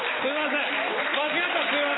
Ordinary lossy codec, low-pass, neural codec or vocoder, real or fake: AAC, 16 kbps; 7.2 kHz; none; real